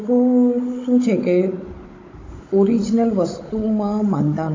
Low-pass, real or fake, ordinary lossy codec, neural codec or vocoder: 7.2 kHz; fake; AAC, 32 kbps; codec, 16 kHz, 16 kbps, FunCodec, trained on Chinese and English, 50 frames a second